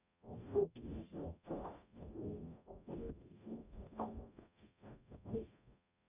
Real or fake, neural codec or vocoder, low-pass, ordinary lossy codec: fake; codec, 44.1 kHz, 0.9 kbps, DAC; 3.6 kHz; none